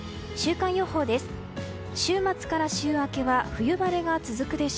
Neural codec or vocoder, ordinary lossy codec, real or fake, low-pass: none; none; real; none